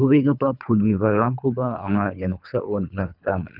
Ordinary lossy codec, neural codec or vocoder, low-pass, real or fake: none; codec, 24 kHz, 3 kbps, HILCodec; 5.4 kHz; fake